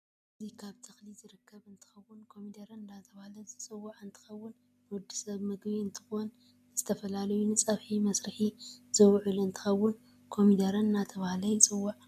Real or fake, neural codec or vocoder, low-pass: real; none; 14.4 kHz